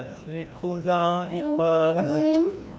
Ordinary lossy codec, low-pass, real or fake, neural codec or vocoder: none; none; fake; codec, 16 kHz, 1 kbps, FreqCodec, larger model